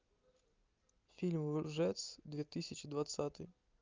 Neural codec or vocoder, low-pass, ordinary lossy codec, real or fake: none; 7.2 kHz; Opus, 32 kbps; real